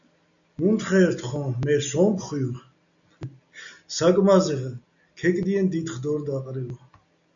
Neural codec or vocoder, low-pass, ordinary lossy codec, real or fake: none; 7.2 kHz; MP3, 96 kbps; real